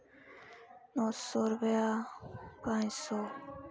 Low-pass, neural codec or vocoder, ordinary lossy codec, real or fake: none; none; none; real